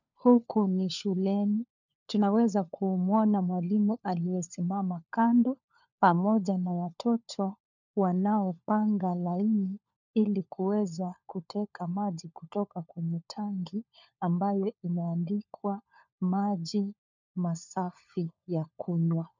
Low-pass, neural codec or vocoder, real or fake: 7.2 kHz; codec, 16 kHz, 4 kbps, FunCodec, trained on LibriTTS, 50 frames a second; fake